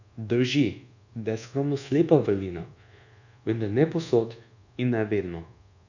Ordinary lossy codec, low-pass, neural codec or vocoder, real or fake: none; 7.2 kHz; codec, 24 kHz, 1.2 kbps, DualCodec; fake